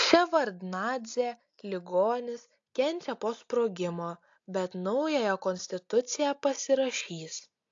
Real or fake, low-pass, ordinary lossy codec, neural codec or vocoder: real; 7.2 kHz; AAC, 48 kbps; none